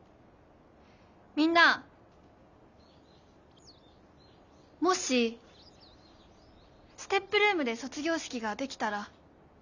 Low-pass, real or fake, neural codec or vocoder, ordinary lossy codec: 7.2 kHz; real; none; none